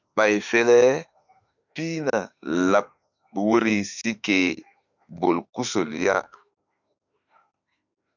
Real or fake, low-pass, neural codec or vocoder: fake; 7.2 kHz; autoencoder, 48 kHz, 32 numbers a frame, DAC-VAE, trained on Japanese speech